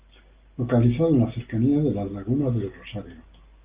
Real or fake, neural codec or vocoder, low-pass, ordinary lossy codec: real; none; 3.6 kHz; Opus, 32 kbps